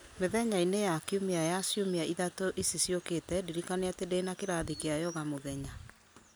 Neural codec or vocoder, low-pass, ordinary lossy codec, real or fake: none; none; none; real